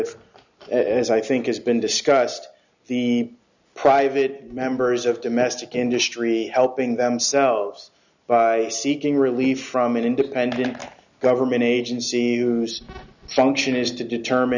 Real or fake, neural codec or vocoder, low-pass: real; none; 7.2 kHz